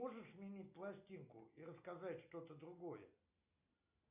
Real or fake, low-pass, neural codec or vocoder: real; 3.6 kHz; none